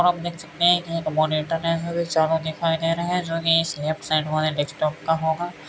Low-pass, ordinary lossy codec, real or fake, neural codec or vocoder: none; none; real; none